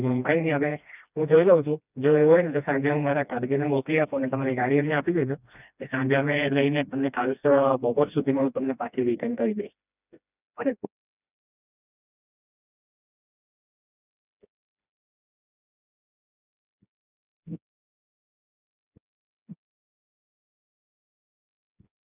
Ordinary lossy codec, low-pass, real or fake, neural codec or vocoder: none; 3.6 kHz; fake; codec, 16 kHz, 1 kbps, FreqCodec, smaller model